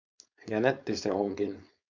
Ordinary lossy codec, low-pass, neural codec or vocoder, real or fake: MP3, 64 kbps; 7.2 kHz; codec, 16 kHz, 4.8 kbps, FACodec; fake